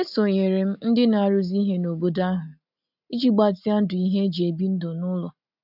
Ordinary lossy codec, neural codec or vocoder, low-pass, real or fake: none; none; 5.4 kHz; real